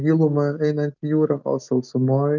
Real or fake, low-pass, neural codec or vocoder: real; 7.2 kHz; none